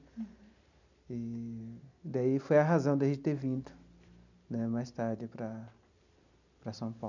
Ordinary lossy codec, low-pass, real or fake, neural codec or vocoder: none; 7.2 kHz; real; none